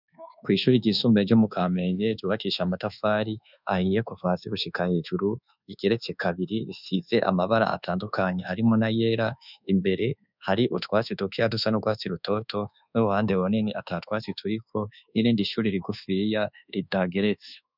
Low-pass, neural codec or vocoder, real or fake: 5.4 kHz; codec, 24 kHz, 1.2 kbps, DualCodec; fake